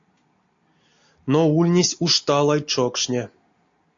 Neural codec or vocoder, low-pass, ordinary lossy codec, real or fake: none; 7.2 kHz; AAC, 48 kbps; real